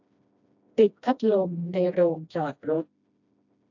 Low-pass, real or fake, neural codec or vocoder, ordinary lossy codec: 7.2 kHz; fake; codec, 16 kHz, 1 kbps, FreqCodec, smaller model; AAC, 48 kbps